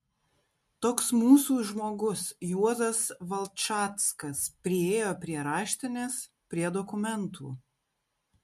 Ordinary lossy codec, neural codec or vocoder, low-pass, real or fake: MP3, 64 kbps; none; 14.4 kHz; real